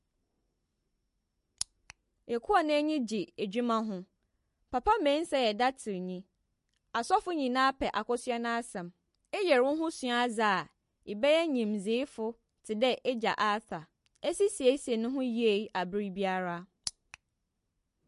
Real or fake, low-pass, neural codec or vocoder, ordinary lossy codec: real; 14.4 kHz; none; MP3, 48 kbps